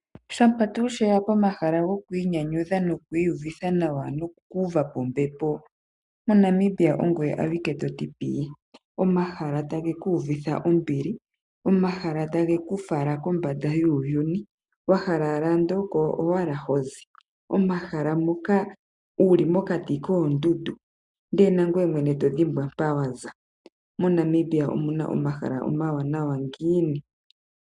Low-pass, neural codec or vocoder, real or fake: 10.8 kHz; none; real